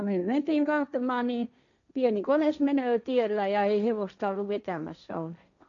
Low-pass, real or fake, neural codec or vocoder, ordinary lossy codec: 7.2 kHz; fake; codec, 16 kHz, 1.1 kbps, Voila-Tokenizer; none